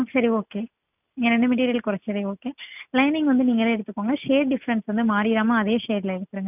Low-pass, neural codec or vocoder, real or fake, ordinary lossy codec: 3.6 kHz; none; real; none